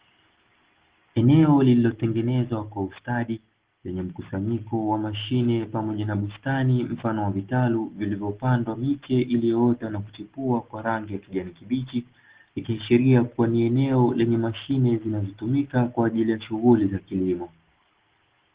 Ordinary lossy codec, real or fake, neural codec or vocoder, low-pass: Opus, 16 kbps; real; none; 3.6 kHz